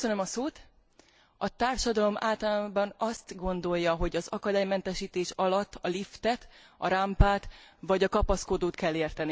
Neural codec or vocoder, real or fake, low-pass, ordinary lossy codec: none; real; none; none